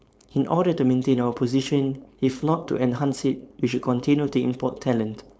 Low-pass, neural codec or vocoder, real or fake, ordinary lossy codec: none; codec, 16 kHz, 4.8 kbps, FACodec; fake; none